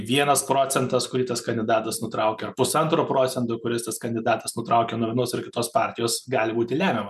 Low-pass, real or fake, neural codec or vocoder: 14.4 kHz; real; none